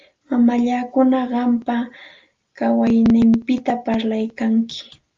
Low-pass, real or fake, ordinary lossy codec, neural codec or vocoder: 7.2 kHz; real; Opus, 32 kbps; none